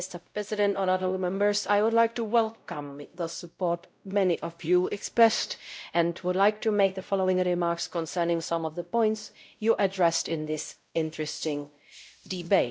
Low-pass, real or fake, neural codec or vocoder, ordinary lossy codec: none; fake; codec, 16 kHz, 0.5 kbps, X-Codec, WavLM features, trained on Multilingual LibriSpeech; none